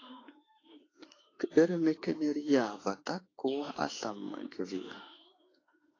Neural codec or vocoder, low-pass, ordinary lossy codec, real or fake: autoencoder, 48 kHz, 32 numbers a frame, DAC-VAE, trained on Japanese speech; 7.2 kHz; AAC, 32 kbps; fake